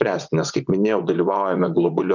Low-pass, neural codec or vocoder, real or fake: 7.2 kHz; vocoder, 24 kHz, 100 mel bands, Vocos; fake